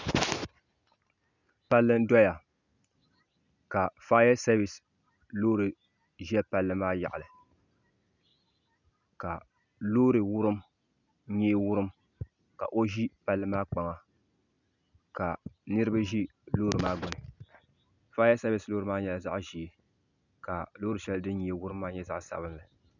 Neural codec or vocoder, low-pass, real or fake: none; 7.2 kHz; real